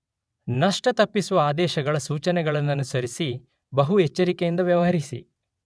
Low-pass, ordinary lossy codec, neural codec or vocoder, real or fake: none; none; vocoder, 22.05 kHz, 80 mel bands, WaveNeXt; fake